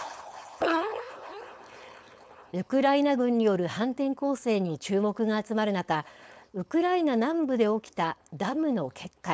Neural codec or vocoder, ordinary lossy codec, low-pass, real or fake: codec, 16 kHz, 4.8 kbps, FACodec; none; none; fake